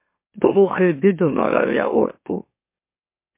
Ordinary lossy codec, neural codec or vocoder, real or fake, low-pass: MP3, 24 kbps; autoencoder, 44.1 kHz, a latent of 192 numbers a frame, MeloTTS; fake; 3.6 kHz